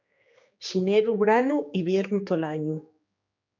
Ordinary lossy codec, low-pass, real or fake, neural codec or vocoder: MP3, 64 kbps; 7.2 kHz; fake; codec, 16 kHz, 2 kbps, X-Codec, HuBERT features, trained on general audio